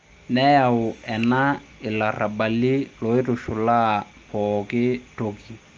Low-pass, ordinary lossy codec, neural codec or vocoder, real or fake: 7.2 kHz; Opus, 24 kbps; none; real